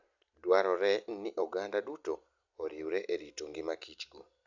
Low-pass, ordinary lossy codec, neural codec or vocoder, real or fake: 7.2 kHz; none; none; real